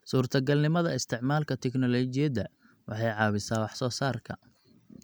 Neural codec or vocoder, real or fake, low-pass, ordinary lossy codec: none; real; none; none